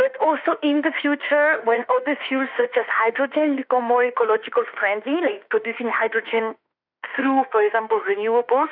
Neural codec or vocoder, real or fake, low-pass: autoencoder, 48 kHz, 32 numbers a frame, DAC-VAE, trained on Japanese speech; fake; 5.4 kHz